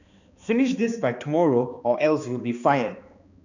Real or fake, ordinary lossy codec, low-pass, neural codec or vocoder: fake; none; 7.2 kHz; codec, 16 kHz, 2 kbps, X-Codec, HuBERT features, trained on balanced general audio